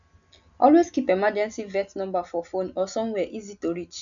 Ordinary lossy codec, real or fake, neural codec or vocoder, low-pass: none; real; none; 7.2 kHz